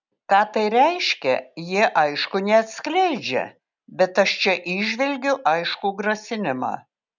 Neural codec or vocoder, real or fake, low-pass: none; real; 7.2 kHz